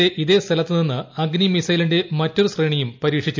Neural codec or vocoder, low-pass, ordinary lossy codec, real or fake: none; 7.2 kHz; MP3, 64 kbps; real